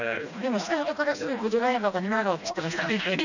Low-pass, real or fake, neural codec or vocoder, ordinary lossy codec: 7.2 kHz; fake; codec, 16 kHz, 1 kbps, FreqCodec, smaller model; none